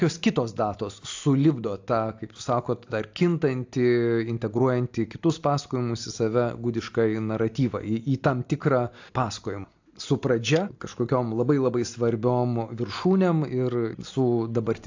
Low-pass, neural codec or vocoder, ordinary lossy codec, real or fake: 7.2 kHz; none; AAC, 48 kbps; real